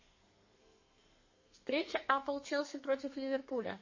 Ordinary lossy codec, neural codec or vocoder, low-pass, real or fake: MP3, 32 kbps; codec, 32 kHz, 1.9 kbps, SNAC; 7.2 kHz; fake